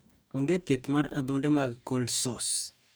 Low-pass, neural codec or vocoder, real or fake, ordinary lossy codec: none; codec, 44.1 kHz, 2.6 kbps, DAC; fake; none